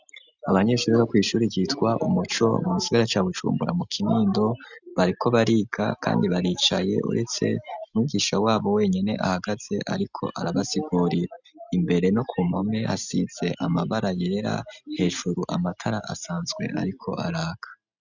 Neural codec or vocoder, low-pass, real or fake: none; 7.2 kHz; real